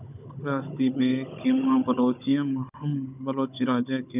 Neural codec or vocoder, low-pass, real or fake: codec, 16 kHz, 16 kbps, FunCodec, trained on Chinese and English, 50 frames a second; 3.6 kHz; fake